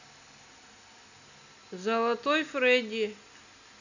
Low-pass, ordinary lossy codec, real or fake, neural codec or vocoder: 7.2 kHz; none; real; none